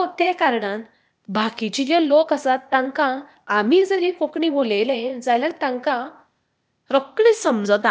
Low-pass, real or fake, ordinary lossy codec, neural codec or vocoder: none; fake; none; codec, 16 kHz, 0.8 kbps, ZipCodec